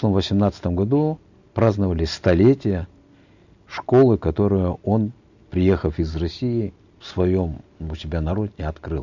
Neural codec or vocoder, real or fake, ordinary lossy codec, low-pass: none; real; none; 7.2 kHz